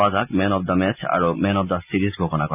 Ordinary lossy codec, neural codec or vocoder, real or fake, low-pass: none; none; real; 3.6 kHz